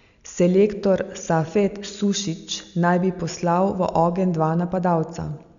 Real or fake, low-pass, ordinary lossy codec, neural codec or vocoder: real; 7.2 kHz; none; none